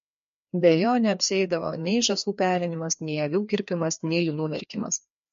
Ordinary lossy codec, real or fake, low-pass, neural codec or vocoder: MP3, 48 kbps; fake; 7.2 kHz; codec, 16 kHz, 2 kbps, FreqCodec, larger model